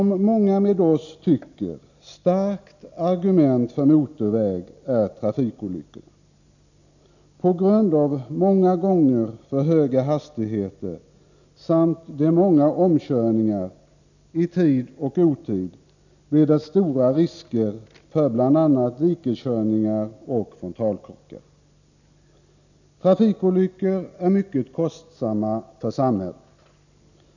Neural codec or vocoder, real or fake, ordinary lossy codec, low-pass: none; real; none; 7.2 kHz